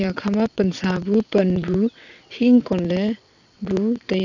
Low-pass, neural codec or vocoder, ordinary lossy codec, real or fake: 7.2 kHz; vocoder, 22.05 kHz, 80 mel bands, WaveNeXt; none; fake